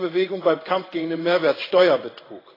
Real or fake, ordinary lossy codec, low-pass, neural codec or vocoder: fake; AAC, 24 kbps; 5.4 kHz; codec, 16 kHz in and 24 kHz out, 1 kbps, XY-Tokenizer